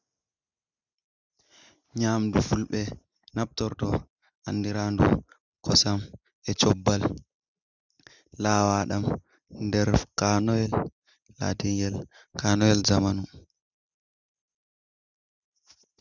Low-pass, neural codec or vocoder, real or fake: 7.2 kHz; none; real